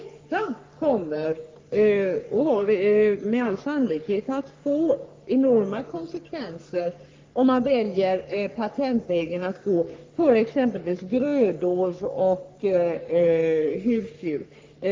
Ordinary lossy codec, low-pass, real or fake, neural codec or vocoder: Opus, 32 kbps; 7.2 kHz; fake; codec, 44.1 kHz, 3.4 kbps, Pupu-Codec